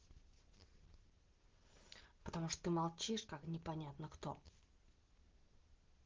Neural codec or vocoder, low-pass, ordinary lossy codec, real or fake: none; 7.2 kHz; Opus, 16 kbps; real